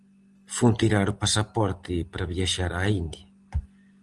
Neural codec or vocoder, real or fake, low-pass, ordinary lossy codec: none; real; 10.8 kHz; Opus, 24 kbps